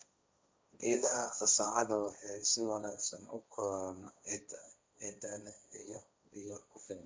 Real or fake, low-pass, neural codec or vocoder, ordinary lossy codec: fake; none; codec, 16 kHz, 1.1 kbps, Voila-Tokenizer; none